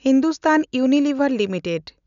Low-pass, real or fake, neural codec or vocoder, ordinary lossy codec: 7.2 kHz; real; none; none